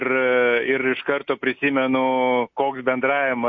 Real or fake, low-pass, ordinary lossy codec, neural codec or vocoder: real; 7.2 kHz; MP3, 48 kbps; none